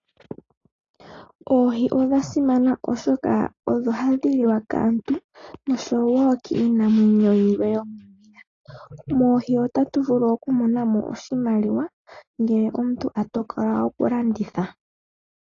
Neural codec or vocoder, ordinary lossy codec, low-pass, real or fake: none; AAC, 32 kbps; 7.2 kHz; real